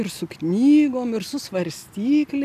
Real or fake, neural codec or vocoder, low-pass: real; none; 14.4 kHz